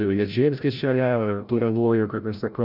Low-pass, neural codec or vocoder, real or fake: 5.4 kHz; codec, 16 kHz, 0.5 kbps, FreqCodec, larger model; fake